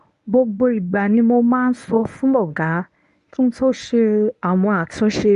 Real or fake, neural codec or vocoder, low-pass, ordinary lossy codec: fake; codec, 24 kHz, 0.9 kbps, WavTokenizer, medium speech release version 1; 10.8 kHz; none